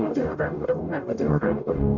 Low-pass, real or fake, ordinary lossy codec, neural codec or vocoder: 7.2 kHz; fake; Opus, 64 kbps; codec, 44.1 kHz, 0.9 kbps, DAC